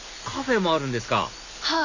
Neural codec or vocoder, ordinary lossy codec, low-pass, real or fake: none; none; 7.2 kHz; real